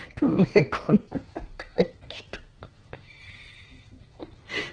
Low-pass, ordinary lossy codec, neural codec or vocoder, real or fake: 9.9 kHz; Opus, 16 kbps; codec, 32 kHz, 1.9 kbps, SNAC; fake